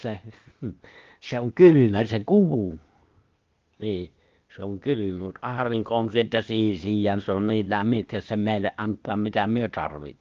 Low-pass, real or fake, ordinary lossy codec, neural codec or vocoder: 7.2 kHz; fake; Opus, 32 kbps; codec, 16 kHz, 0.8 kbps, ZipCodec